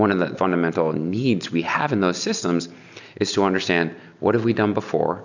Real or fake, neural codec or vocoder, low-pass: real; none; 7.2 kHz